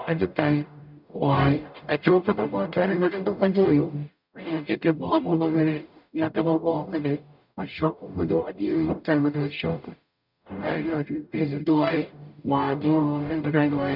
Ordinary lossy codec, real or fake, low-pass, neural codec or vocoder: none; fake; 5.4 kHz; codec, 44.1 kHz, 0.9 kbps, DAC